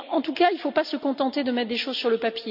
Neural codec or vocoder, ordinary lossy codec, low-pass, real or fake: none; none; 5.4 kHz; real